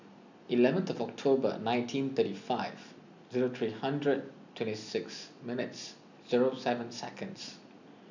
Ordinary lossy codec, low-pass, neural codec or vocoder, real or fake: none; 7.2 kHz; none; real